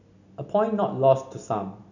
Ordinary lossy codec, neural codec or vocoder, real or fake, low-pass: none; none; real; 7.2 kHz